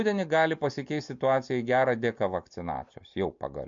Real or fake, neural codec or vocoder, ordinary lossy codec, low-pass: real; none; MP3, 48 kbps; 7.2 kHz